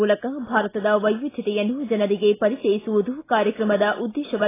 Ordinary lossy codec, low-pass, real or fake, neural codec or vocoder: AAC, 16 kbps; 3.6 kHz; real; none